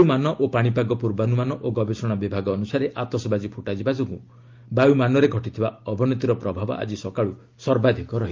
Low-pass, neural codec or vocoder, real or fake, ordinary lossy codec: 7.2 kHz; none; real; Opus, 32 kbps